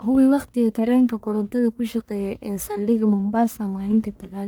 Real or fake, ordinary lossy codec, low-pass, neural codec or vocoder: fake; none; none; codec, 44.1 kHz, 1.7 kbps, Pupu-Codec